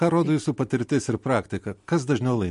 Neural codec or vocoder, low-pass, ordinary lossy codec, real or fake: none; 14.4 kHz; MP3, 48 kbps; real